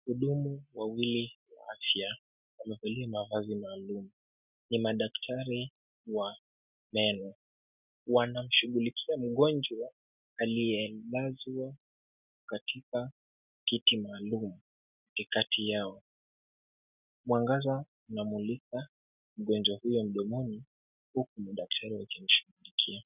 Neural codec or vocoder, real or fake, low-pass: none; real; 3.6 kHz